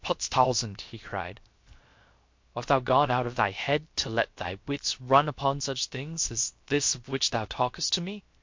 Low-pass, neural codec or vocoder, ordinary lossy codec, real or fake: 7.2 kHz; codec, 16 kHz, 0.7 kbps, FocalCodec; MP3, 48 kbps; fake